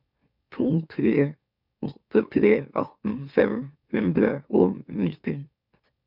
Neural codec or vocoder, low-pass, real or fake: autoencoder, 44.1 kHz, a latent of 192 numbers a frame, MeloTTS; 5.4 kHz; fake